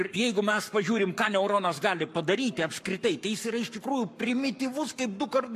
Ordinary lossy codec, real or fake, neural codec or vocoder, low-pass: AAC, 96 kbps; fake; codec, 44.1 kHz, 7.8 kbps, Pupu-Codec; 14.4 kHz